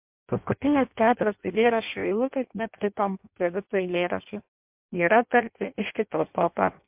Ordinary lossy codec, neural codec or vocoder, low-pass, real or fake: MP3, 32 kbps; codec, 16 kHz in and 24 kHz out, 0.6 kbps, FireRedTTS-2 codec; 3.6 kHz; fake